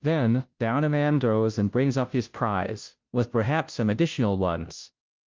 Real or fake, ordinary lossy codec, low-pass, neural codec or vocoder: fake; Opus, 24 kbps; 7.2 kHz; codec, 16 kHz, 0.5 kbps, FunCodec, trained on Chinese and English, 25 frames a second